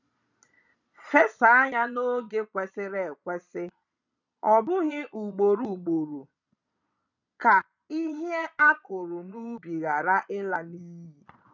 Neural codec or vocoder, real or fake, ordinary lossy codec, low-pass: vocoder, 22.05 kHz, 80 mel bands, Vocos; fake; none; 7.2 kHz